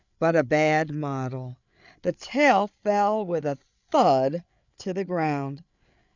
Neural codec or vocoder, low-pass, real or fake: codec, 16 kHz, 8 kbps, FreqCodec, larger model; 7.2 kHz; fake